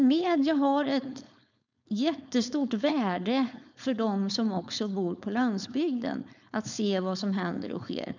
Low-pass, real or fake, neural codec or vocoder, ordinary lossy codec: 7.2 kHz; fake; codec, 16 kHz, 4.8 kbps, FACodec; none